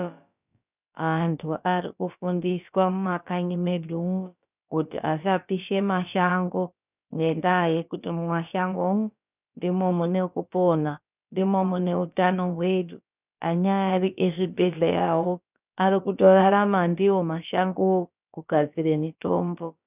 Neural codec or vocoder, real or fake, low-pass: codec, 16 kHz, about 1 kbps, DyCAST, with the encoder's durations; fake; 3.6 kHz